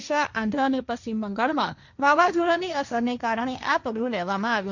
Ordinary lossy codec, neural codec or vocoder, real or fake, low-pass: none; codec, 16 kHz, 1.1 kbps, Voila-Tokenizer; fake; 7.2 kHz